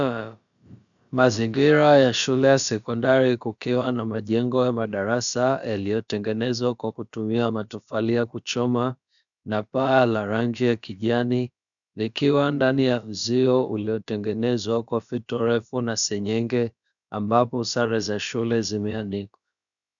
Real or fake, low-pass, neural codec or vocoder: fake; 7.2 kHz; codec, 16 kHz, about 1 kbps, DyCAST, with the encoder's durations